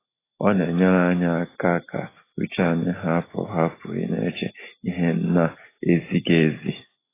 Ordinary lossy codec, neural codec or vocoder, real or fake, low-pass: AAC, 16 kbps; none; real; 3.6 kHz